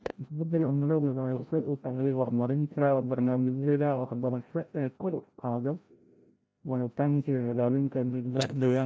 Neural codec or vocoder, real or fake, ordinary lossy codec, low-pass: codec, 16 kHz, 0.5 kbps, FreqCodec, larger model; fake; none; none